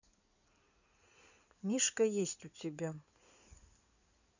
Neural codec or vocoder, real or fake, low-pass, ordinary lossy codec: codec, 16 kHz in and 24 kHz out, 2.2 kbps, FireRedTTS-2 codec; fake; 7.2 kHz; none